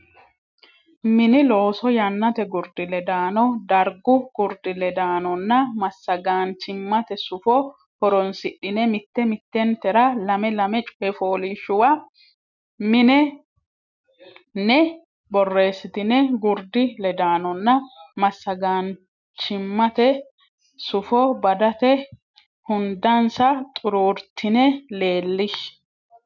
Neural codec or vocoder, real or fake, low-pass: none; real; 7.2 kHz